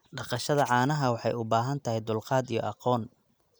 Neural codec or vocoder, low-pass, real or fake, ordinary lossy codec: none; none; real; none